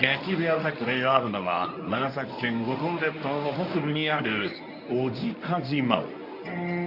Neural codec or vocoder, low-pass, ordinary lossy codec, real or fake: codec, 24 kHz, 0.9 kbps, WavTokenizer, medium speech release version 1; 5.4 kHz; none; fake